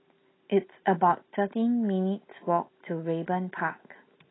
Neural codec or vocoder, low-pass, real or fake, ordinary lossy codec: none; 7.2 kHz; real; AAC, 16 kbps